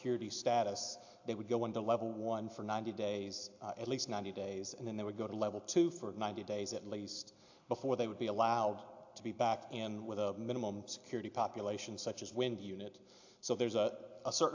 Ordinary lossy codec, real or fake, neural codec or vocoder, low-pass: AAC, 48 kbps; real; none; 7.2 kHz